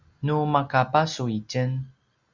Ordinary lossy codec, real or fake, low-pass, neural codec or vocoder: Opus, 64 kbps; real; 7.2 kHz; none